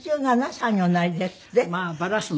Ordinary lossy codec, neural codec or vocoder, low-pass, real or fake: none; none; none; real